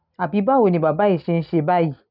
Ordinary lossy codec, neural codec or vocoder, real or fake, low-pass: none; none; real; 5.4 kHz